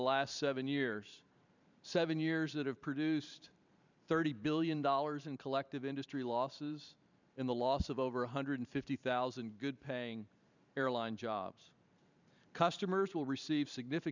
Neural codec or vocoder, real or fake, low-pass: vocoder, 44.1 kHz, 128 mel bands every 512 samples, BigVGAN v2; fake; 7.2 kHz